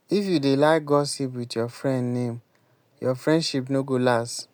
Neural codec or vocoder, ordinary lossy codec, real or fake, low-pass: none; none; real; none